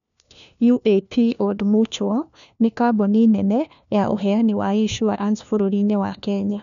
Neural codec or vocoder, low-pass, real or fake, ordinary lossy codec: codec, 16 kHz, 1 kbps, FunCodec, trained on LibriTTS, 50 frames a second; 7.2 kHz; fake; none